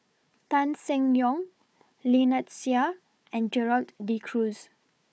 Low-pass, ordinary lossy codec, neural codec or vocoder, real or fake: none; none; codec, 16 kHz, 4 kbps, FunCodec, trained on Chinese and English, 50 frames a second; fake